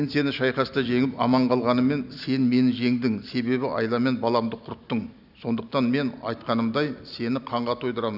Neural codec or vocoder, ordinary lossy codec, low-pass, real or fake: none; none; 5.4 kHz; real